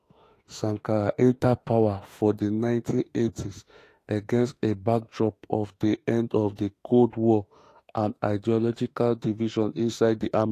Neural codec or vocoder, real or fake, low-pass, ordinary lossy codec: autoencoder, 48 kHz, 32 numbers a frame, DAC-VAE, trained on Japanese speech; fake; 14.4 kHz; AAC, 48 kbps